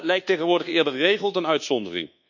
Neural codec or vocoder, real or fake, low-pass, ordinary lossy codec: codec, 24 kHz, 1.2 kbps, DualCodec; fake; 7.2 kHz; none